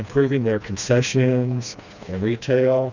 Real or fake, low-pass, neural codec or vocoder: fake; 7.2 kHz; codec, 16 kHz, 2 kbps, FreqCodec, smaller model